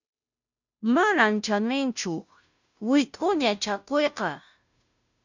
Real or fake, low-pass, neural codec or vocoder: fake; 7.2 kHz; codec, 16 kHz, 0.5 kbps, FunCodec, trained on Chinese and English, 25 frames a second